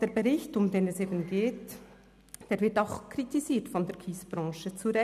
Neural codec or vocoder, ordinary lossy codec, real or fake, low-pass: none; none; real; 14.4 kHz